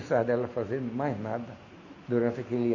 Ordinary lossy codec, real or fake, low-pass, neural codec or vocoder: none; real; 7.2 kHz; none